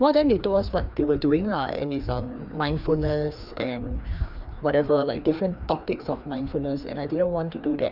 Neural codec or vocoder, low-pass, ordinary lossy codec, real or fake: codec, 16 kHz, 2 kbps, FreqCodec, larger model; 5.4 kHz; none; fake